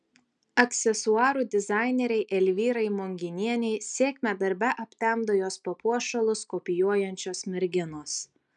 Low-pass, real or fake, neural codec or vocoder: 9.9 kHz; real; none